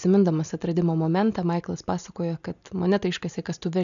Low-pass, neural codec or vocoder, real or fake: 7.2 kHz; none; real